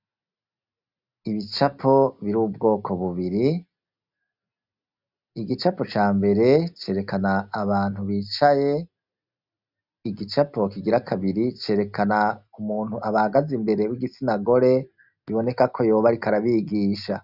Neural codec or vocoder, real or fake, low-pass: none; real; 5.4 kHz